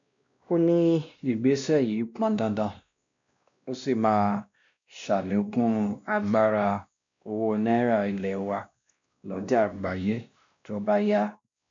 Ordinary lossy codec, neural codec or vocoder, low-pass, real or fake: MP3, 64 kbps; codec, 16 kHz, 1 kbps, X-Codec, WavLM features, trained on Multilingual LibriSpeech; 7.2 kHz; fake